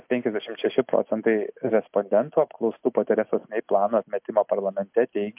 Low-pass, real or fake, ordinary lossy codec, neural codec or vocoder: 3.6 kHz; real; MP3, 32 kbps; none